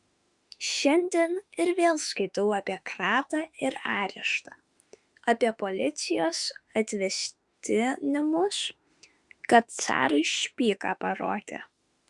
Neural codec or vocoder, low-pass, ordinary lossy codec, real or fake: autoencoder, 48 kHz, 32 numbers a frame, DAC-VAE, trained on Japanese speech; 10.8 kHz; Opus, 64 kbps; fake